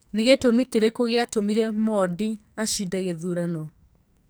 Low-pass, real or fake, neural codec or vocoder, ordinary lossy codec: none; fake; codec, 44.1 kHz, 2.6 kbps, SNAC; none